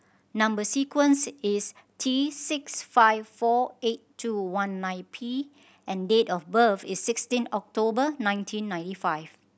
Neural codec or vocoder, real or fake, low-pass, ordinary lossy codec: none; real; none; none